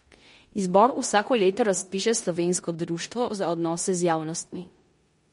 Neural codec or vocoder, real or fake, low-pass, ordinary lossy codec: codec, 16 kHz in and 24 kHz out, 0.9 kbps, LongCat-Audio-Codec, four codebook decoder; fake; 10.8 kHz; MP3, 48 kbps